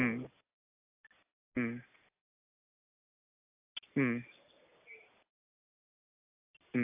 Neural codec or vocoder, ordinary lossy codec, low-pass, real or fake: none; AAC, 32 kbps; 3.6 kHz; real